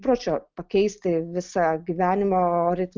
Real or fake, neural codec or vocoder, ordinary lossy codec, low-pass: real; none; Opus, 24 kbps; 7.2 kHz